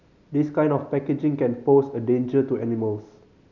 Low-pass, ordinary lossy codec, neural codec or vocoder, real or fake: 7.2 kHz; none; none; real